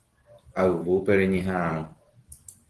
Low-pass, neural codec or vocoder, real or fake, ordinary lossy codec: 10.8 kHz; none; real; Opus, 16 kbps